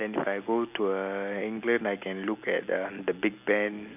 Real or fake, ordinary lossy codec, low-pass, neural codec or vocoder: real; none; 3.6 kHz; none